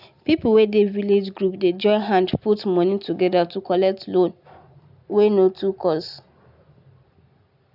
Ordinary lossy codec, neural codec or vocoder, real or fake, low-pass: none; none; real; 5.4 kHz